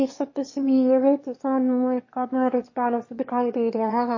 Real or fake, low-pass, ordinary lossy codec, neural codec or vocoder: fake; 7.2 kHz; MP3, 32 kbps; autoencoder, 22.05 kHz, a latent of 192 numbers a frame, VITS, trained on one speaker